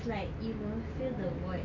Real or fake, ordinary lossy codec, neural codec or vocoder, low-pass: real; none; none; 7.2 kHz